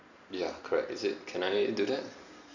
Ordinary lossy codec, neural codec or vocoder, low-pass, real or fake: none; none; 7.2 kHz; real